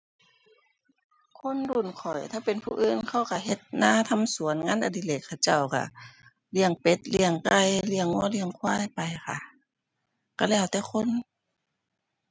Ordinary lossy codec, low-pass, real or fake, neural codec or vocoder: none; none; real; none